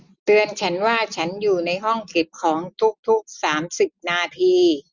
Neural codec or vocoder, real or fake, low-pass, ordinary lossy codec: none; real; 7.2 kHz; Opus, 64 kbps